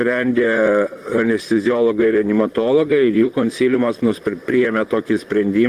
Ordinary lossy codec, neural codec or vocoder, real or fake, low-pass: Opus, 24 kbps; vocoder, 44.1 kHz, 128 mel bands, Pupu-Vocoder; fake; 14.4 kHz